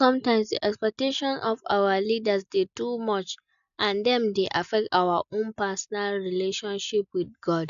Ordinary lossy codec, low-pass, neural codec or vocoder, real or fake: none; 7.2 kHz; none; real